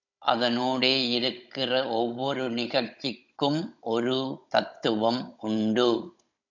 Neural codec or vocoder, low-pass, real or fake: codec, 16 kHz, 16 kbps, FunCodec, trained on Chinese and English, 50 frames a second; 7.2 kHz; fake